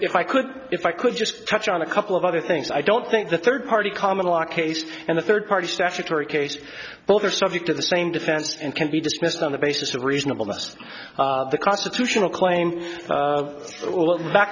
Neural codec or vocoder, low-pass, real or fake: none; 7.2 kHz; real